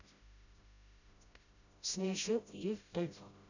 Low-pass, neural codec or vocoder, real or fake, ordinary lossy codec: 7.2 kHz; codec, 16 kHz, 0.5 kbps, FreqCodec, smaller model; fake; none